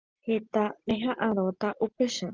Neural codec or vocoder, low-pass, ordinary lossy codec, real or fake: none; 7.2 kHz; Opus, 24 kbps; real